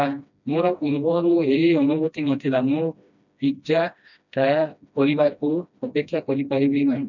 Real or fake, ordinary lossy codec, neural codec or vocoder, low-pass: fake; none; codec, 16 kHz, 1 kbps, FreqCodec, smaller model; 7.2 kHz